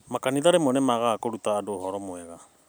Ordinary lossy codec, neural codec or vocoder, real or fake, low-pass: none; none; real; none